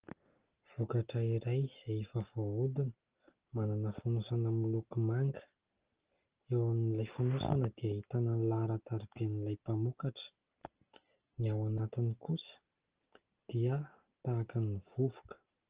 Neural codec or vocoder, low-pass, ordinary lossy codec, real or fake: none; 3.6 kHz; Opus, 16 kbps; real